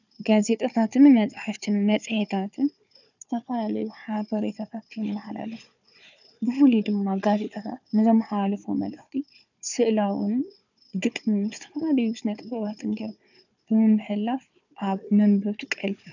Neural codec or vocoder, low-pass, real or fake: codec, 16 kHz, 4 kbps, FunCodec, trained on Chinese and English, 50 frames a second; 7.2 kHz; fake